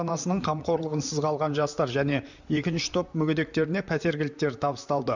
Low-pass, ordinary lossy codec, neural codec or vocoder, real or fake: 7.2 kHz; none; vocoder, 44.1 kHz, 128 mel bands, Pupu-Vocoder; fake